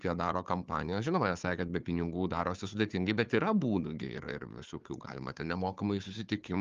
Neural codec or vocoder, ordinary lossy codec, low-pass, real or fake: codec, 16 kHz, 4 kbps, FunCodec, trained on Chinese and English, 50 frames a second; Opus, 32 kbps; 7.2 kHz; fake